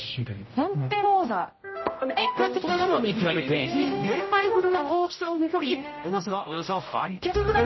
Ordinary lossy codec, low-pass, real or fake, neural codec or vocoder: MP3, 24 kbps; 7.2 kHz; fake; codec, 16 kHz, 0.5 kbps, X-Codec, HuBERT features, trained on general audio